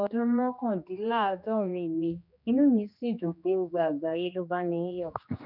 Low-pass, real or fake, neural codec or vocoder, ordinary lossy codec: 5.4 kHz; fake; codec, 16 kHz, 2 kbps, X-Codec, HuBERT features, trained on general audio; none